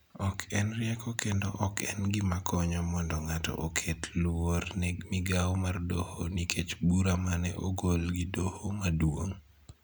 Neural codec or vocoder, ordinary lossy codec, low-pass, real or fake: none; none; none; real